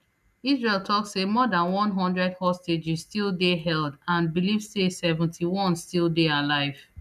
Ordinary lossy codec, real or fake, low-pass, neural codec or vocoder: none; real; 14.4 kHz; none